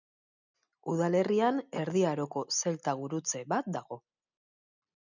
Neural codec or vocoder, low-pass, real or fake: none; 7.2 kHz; real